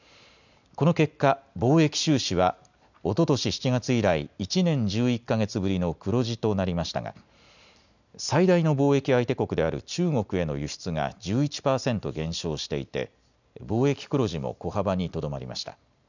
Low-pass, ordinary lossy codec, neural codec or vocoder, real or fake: 7.2 kHz; none; none; real